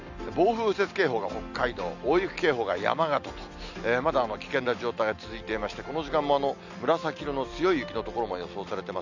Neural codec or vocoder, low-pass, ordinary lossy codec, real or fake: none; 7.2 kHz; none; real